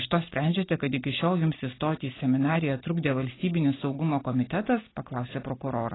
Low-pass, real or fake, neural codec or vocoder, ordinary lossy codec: 7.2 kHz; real; none; AAC, 16 kbps